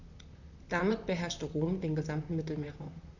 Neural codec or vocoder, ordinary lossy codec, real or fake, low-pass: vocoder, 44.1 kHz, 128 mel bands, Pupu-Vocoder; none; fake; 7.2 kHz